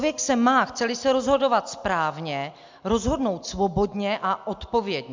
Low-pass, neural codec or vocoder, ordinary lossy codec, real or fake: 7.2 kHz; none; MP3, 64 kbps; real